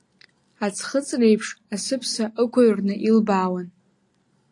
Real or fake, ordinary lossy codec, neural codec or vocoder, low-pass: real; AAC, 48 kbps; none; 10.8 kHz